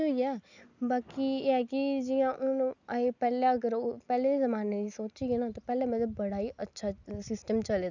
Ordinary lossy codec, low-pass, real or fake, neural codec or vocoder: none; 7.2 kHz; real; none